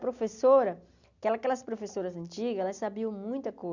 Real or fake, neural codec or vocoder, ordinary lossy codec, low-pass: real; none; none; 7.2 kHz